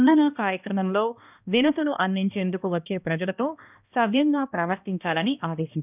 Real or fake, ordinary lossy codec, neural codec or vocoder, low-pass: fake; none; codec, 16 kHz, 1 kbps, X-Codec, HuBERT features, trained on balanced general audio; 3.6 kHz